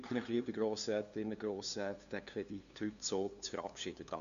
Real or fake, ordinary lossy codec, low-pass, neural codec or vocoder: fake; none; 7.2 kHz; codec, 16 kHz, 2 kbps, FunCodec, trained on LibriTTS, 25 frames a second